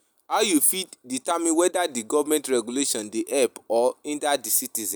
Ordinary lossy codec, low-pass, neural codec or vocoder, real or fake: none; none; none; real